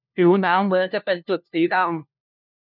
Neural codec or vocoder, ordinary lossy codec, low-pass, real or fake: codec, 16 kHz, 1 kbps, FunCodec, trained on LibriTTS, 50 frames a second; none; 5.4 kHz; fake